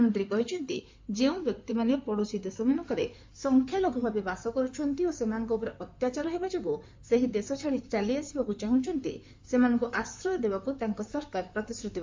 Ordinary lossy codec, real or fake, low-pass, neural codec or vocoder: MP3, 64 kbps; fake; 7.2 kHz; codec, 16 kHz in and 24 kHz out, 2.2 kbps, FireRedTTS-2 codec